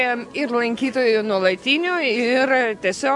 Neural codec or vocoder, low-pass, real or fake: none; 10.8 kHz; real